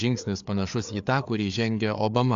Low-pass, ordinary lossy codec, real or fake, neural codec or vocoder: 7.2 kHz; AAC, 64 kbps; fake; codec, 16 kHz, 4 kbps, FreqCodec, larger model